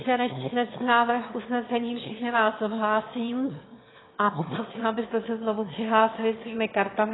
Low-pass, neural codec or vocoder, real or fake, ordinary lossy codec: 7.2 kHz; autoencoder, 22.05 kHz, a latent of 192 numbers a frame, VITS, trained on one speaker; fake; AAC, 16 kbps